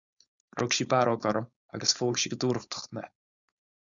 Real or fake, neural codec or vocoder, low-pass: fake; codec, 16 kHz, 4.8 kbps, FACodec; 7.2 kHz